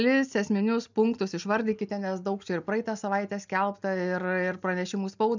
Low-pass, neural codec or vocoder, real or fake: 7.2 kHz; none; real